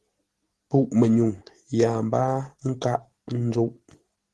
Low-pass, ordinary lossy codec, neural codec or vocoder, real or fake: 10.8 kHz; Opus, 16 kbps; none; real